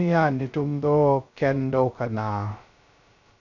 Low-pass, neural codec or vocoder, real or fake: 7.2 kHz; codec, 16 kHz, 0.2 kbps, FocalCodec; fake